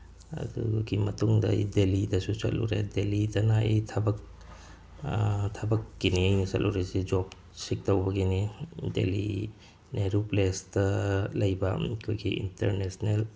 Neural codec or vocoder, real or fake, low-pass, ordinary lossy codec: none; real; none; none